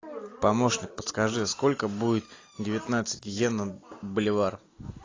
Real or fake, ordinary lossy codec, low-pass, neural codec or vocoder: real; AAC, 32 kbps; 7.2 kHz; none